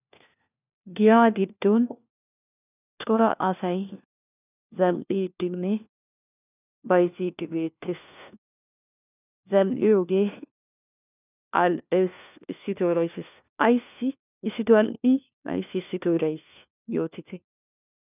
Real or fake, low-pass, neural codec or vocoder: fake; 3.6 kHz; codec, 16 kHz, 1 kbps, FunCodec, trained on LibriTTS, 50 frames a second